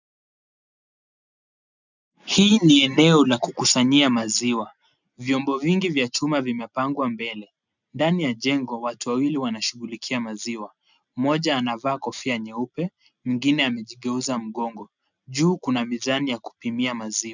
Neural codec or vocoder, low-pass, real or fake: none; 7.2 kHz; real